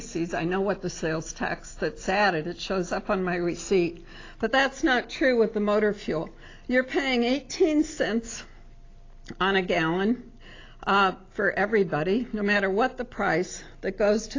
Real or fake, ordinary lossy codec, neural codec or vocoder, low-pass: real; AAC, 32 kbps; none; 7.2 kHz